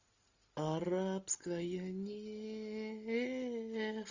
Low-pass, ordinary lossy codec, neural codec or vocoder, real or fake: 7.2 kHz; Opus, 64 kbps; none; real